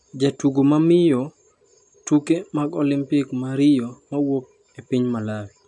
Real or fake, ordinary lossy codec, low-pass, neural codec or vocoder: real; AAC, 64 kbps; 10.8 kHz; none